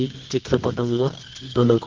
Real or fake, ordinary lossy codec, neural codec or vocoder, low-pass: fake; Opus, 16 kbps; codec, 24 kHz, 0.9 kbps, WavTokenizer, medium music audio release; 7.2 kHz